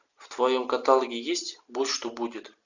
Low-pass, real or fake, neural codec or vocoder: 7.2 kHz; real; none